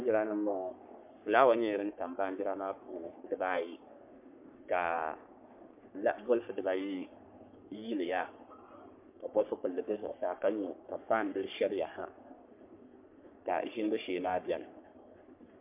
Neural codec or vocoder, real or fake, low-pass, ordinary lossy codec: codec, 44.1 kHz, 3.4 kbps, Pupu-Codec; fake; 3.6 kHz; MP3, 32 kbps